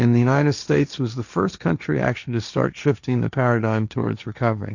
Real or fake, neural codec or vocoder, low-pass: fake; codec, 16 kHz, 1.1 kbps, Voila-Tokenizer; 7.2 kHz